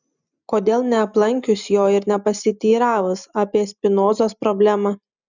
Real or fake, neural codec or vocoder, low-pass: real; none; 7.2 kHz